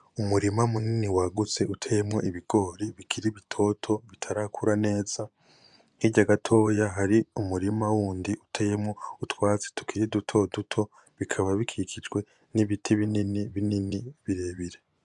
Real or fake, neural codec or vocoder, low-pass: fake; vocoder, 24 kHz, 100 mel bands, Vocos; 10.8 kHz